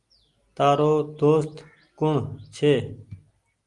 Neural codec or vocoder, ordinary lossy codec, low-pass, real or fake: none; Opus, 24 kbps; 10.8 kHz; real